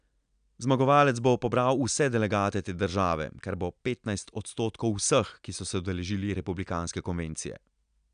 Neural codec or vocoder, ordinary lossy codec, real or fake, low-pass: none; none; real; 9.9 kHz